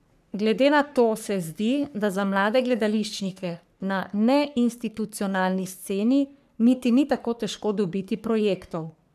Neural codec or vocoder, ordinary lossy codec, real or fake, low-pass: codec, 44.1 kHz, 3.4 kbps, Pupu-Codec; none; fake; 14.4 kHz